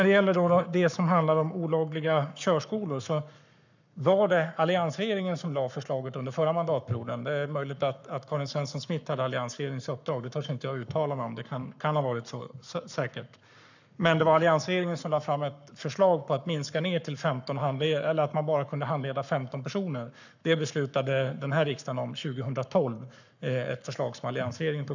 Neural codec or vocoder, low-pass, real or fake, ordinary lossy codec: codec, 44.1 kHz, 7.8 kbps, Pupu-Codec; 7.2 kHz; fake; none